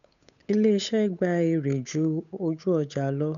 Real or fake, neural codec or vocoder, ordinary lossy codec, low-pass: fake; codec, 16 kHz, 8 kbps, FunCodec, trained on Chinese and English, 25 frames a second; none; 7.2 kHz